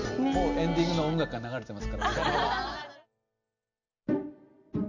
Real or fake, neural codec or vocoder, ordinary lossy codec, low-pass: real; none; none; 7.2 kHz